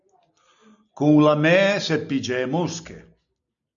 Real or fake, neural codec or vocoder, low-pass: real; none; 7.2 kHz